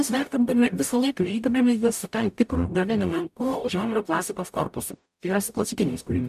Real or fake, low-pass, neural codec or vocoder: fake; 14.4 kHz; codec, 44.1 kHz, 0.9 kbps, DAC